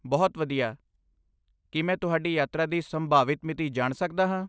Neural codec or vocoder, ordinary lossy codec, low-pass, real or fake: none; none; none; real